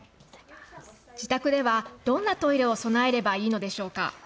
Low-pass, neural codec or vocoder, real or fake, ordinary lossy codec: none; none; real; none